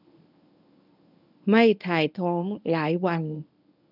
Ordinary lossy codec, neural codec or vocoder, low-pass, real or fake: none; codec, 24 kHz, 0.9 kbps, WavTokenizer, medium speech release version 1; 5.4 kHz; fake